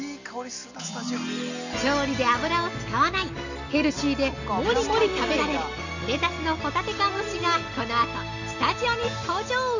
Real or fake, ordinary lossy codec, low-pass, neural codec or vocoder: real; none; 7.2 kHz; none